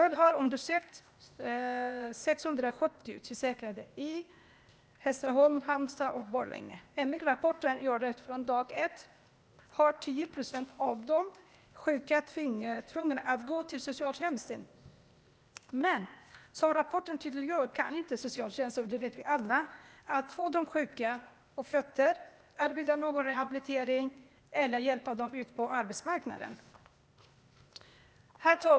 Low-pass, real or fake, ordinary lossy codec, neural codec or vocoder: none; fake; none; codec, 16 kHz, 0.8 kbps, ZipCodec